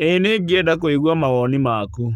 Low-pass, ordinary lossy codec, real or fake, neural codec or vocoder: 19.8 kHz; none; fake; codec, 44.1 kHz, 7.8 kbps, Pupu-Codec